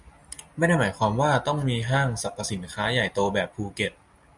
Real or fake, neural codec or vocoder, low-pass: real; none; 10.8 kHz